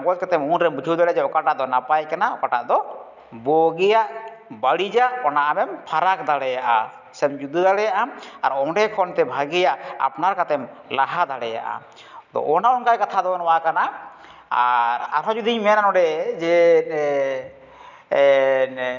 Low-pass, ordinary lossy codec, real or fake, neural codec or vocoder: 7.2 kHz; none; real; none